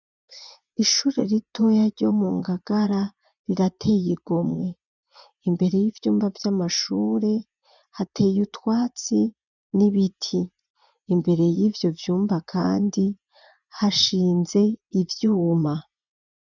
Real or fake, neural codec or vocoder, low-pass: fake; vocoder, 24 kHz, 100 mel bands, Vocos; 7.2 kHz